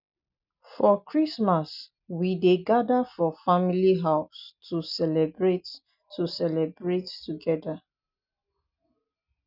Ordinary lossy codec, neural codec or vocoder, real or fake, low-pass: none; none; real; 5.4 kHz